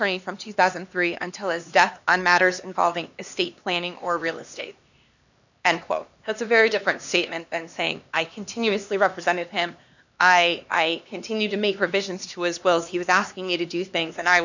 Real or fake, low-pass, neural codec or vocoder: fake; 7.2 kHz; codec, 16 kHz, 2 kbps, X-Codec, HuBERT features, trained on LibriSpeech